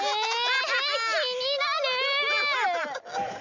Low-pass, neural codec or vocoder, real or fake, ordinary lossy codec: 7.2 kHz; none; real; none